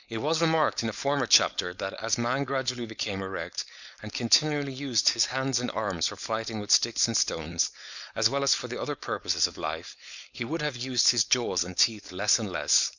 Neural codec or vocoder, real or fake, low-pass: codec, 16 kHz, 4.8 kbps, FACodec; fake; 7.2 kHz